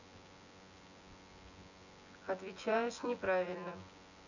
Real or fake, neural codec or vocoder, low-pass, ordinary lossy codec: fake; vocoder, 24 kHz, 100 mel bands, Vocos; 7.2 kHz; none